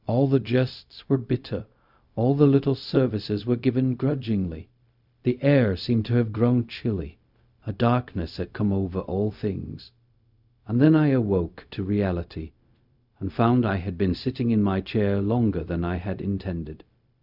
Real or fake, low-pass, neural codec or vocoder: fake; 5.4 kHz; codec, 16 kHz, 0.4 kbps, LongCat-Audio-Codec